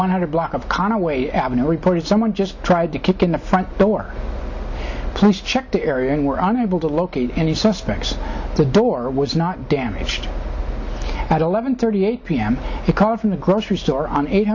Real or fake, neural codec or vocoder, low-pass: real; none; 7.2 kHz